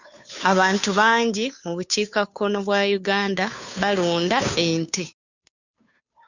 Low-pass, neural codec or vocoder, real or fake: 7.2 kHz; codec, 16 kHz, 2 kbps, FunCodec, trained on Chinese and English, 25 frames a second; fake